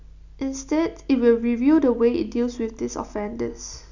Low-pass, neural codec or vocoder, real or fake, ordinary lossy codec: 7.2 kHz; none; real; none